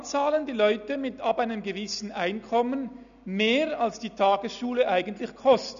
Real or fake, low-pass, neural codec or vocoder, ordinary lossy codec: real; 7.2 kHz; none; none